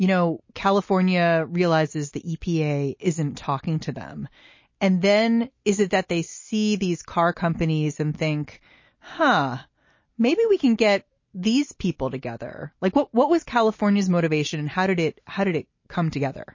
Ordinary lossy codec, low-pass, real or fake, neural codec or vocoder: MP3, 32 kbps; 7.2 kHz; real; none